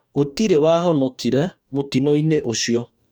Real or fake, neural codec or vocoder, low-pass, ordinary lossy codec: fake; codec, 44.1 kHz, 2.6 kbps, DAC; none; none